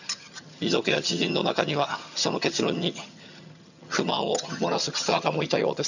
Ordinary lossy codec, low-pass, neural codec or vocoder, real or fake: none; 7.2 kHz; vocoder, 22.05 kHz, 80 mel bands, HiFi-GAN; fake